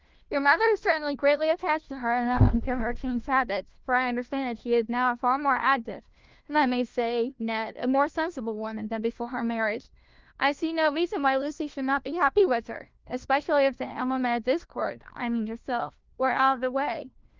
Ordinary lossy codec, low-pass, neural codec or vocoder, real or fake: Opus, 32 kbps; 7.2 kHz; codec, 16 kHz, 1 kbps, FunCodec, trained on LibriTTS, 50 frames a second; fake